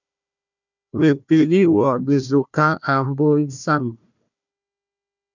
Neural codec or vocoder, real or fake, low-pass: codec, 16 kHz, 1 kbps, FunCodec, trained on Chinese and English, 50 frames a second; fake; 7.2 kHz